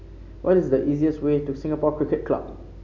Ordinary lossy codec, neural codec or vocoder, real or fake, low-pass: none; none; real; 7.2 kHz